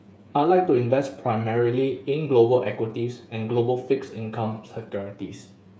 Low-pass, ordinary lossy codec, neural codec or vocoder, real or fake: none; none; codec, 16 kHz, 8 kbps, FreqCodec, smaller model; fake